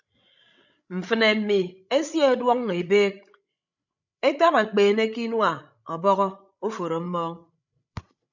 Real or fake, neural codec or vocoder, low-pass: fake; codec, 16 kHz, 16 kbps, FreqCodec, larger model; 7.2 kHz